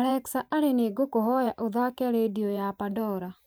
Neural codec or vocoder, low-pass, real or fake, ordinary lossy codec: vocoder, 44.1 kHz, 128 mel bands every 512 samples, BigVGAN v2; none; fake; none